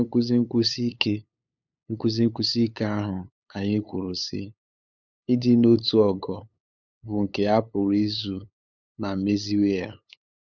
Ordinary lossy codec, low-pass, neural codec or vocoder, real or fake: none; 7.2 kHz; codec, 16 kHz, 8 kbps, FunCodec, trained on LibriTTS, 25 frames a second; fake